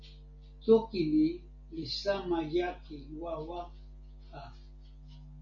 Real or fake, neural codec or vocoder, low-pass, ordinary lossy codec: real; none; 7.2 kHz; AAC, 64 kbps